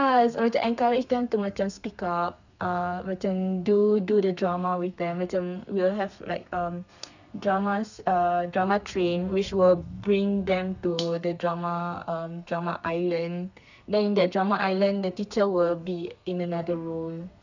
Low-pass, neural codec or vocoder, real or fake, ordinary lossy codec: 7.2 kHz; codec, 32 kHz, 1.9 kbps, SNAC; fake; none